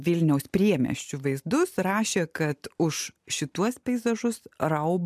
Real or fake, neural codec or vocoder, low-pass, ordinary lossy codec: real; none; 14.4 kHz; MP3, 96 kbps